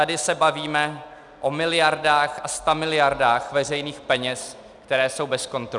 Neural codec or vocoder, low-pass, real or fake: none; 10.8 kHz; real